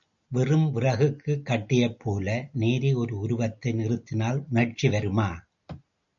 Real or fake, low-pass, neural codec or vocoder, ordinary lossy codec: real; 7.2 kHz; none; MP3, 64 kbps